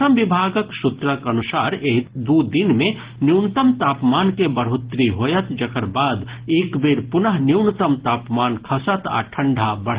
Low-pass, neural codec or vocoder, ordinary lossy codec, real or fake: 3.6 kHz; none; Opus, 16 kbps; real